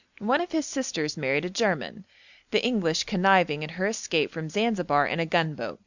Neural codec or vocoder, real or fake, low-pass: none; real; 7.2 kHz